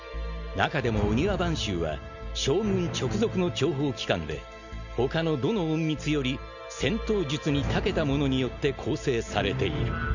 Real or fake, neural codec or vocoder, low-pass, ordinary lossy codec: real; none; 7.2 kHz; none